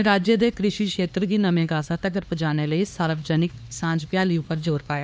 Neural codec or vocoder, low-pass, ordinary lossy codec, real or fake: codec, 16 kHz, 2 kbps, X-Codec, HuBERT features, trained on LibriSpeech; none; none; fake